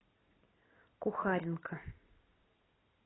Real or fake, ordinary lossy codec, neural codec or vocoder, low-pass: real; AAC, 16 kbps; none; 7.2 kHz